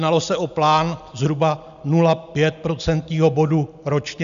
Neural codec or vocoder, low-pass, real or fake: none; 7.2 kHz; real